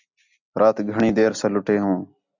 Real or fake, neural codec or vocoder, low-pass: real; none; 7.2 kHz